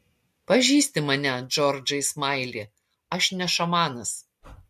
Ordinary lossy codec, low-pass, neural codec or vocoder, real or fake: MP3, 64 kbps; 14.4 kHz; none; real